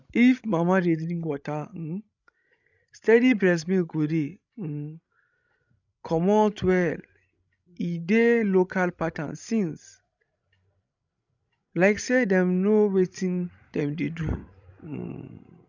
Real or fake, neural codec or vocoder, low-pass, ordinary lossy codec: fake; codec, 16 kHz, 16 kbps, FreqCodec, larger model; 7.2 kHz; none